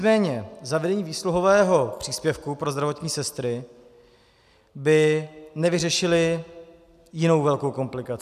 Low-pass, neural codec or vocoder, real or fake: 14.4 kHz; none; real